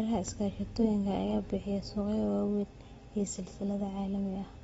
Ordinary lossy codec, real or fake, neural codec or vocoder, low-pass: AAC, 24 kbps; real; none; 19.8 kHz